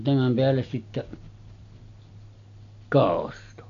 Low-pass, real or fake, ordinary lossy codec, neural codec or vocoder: 7.2 kHz; real; AAC, 48 kbps; none